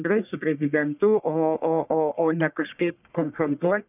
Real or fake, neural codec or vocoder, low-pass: fake; codec, 44.1 kHz, 1.7 kbps, Pupu-Codec; 3.6 kHz